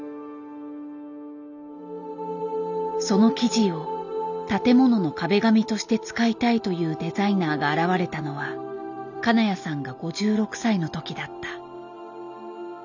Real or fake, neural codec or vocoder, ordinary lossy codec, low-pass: real; none; none; 7.2 kHz